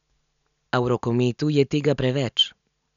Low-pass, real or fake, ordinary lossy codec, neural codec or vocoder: 7.2 kHz; real; none; none